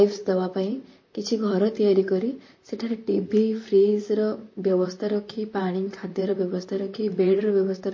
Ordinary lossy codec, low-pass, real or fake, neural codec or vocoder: MP3, 32 kbps; 7.2 kHz; fake; vocoder, 44.1 kHz, 128 mel bands, Pupu-Vocoder